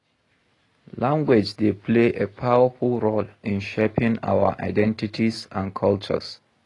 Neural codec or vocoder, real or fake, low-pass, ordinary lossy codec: none; real; 10.8 kHz; AAC, 32 kbps